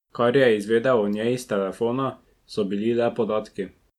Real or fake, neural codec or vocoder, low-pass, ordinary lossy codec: real; none; 19.8 kHz; MP3, 96 kbps